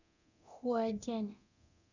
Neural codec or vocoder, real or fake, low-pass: codec, 24 kHz, 0.9 kbps, DualCodec; fake; 7.2 kHz